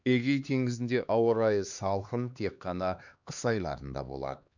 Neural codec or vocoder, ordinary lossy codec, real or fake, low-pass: codec, 16 kHz, 2 kbps, X-Codec, HuBERT features, trained on LibriSpeech; none; fake; 7.2 kHz